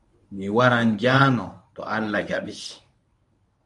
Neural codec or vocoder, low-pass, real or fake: codec, 24 kHz, 0.9 kbps, WavTokenizer, medium speech release version 1; 10.8 kHz; fake